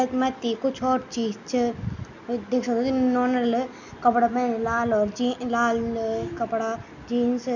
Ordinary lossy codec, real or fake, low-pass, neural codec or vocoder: none; real; 7.2 kHz; none